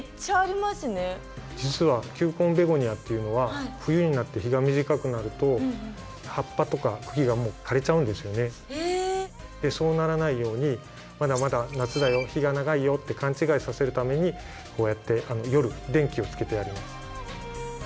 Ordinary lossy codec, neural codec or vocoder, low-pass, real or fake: none; none; none; real